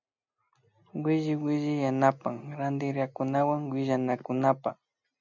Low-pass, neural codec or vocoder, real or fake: 7.2 kHz; none; real